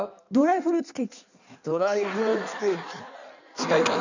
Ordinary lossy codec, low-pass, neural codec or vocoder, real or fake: none; 7.2 kHz; codec, 16 kHz in and 24 kHz out, 1.1 kbps, FireRedTTS-2 codec; fake